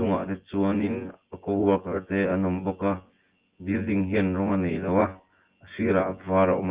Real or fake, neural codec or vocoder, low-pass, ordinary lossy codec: fake; vocoder, 24 kHz, 100 mel bands, Vocos; 3.6 kHz; Opus, 16 kbps